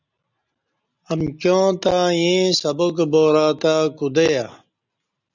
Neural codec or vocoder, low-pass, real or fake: none; 7.2 kHz; real